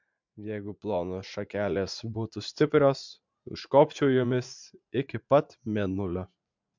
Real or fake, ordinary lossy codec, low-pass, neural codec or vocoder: fake; MP3, 64 kbps; 7.2 kHz; vocoder, 44.1 kHz, 80 mel bands, Vocos